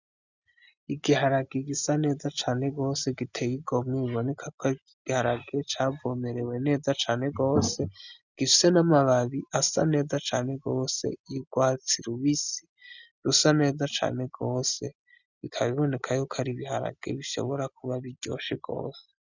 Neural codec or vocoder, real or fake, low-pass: none; real; 7.2 kHz